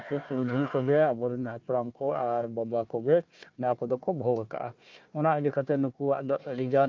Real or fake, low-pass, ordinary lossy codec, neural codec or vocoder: fake; 7.2 kHz; Opus, 24 kbps; codec, 16 kHz, 1 kbps, FunCodec, trained on Chinese and English, 50 frames a second